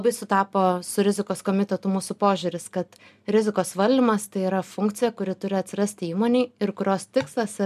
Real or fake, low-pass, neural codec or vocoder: real; 14.4 kHz; none